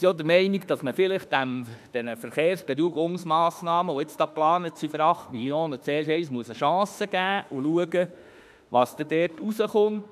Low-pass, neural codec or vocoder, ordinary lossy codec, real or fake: 14.4 kHz; autoencoder, 48 kHz, 32 numbers a frame, DAC-VAE, trained on Japanese speech; none; fake